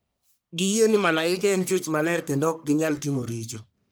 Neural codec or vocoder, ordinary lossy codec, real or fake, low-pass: codec, 44.1 kHz, 1.7 kbps, Pupu-Codec; none; fake; none